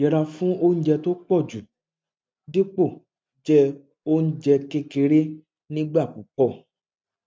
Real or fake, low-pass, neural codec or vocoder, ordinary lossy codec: real; none; none; none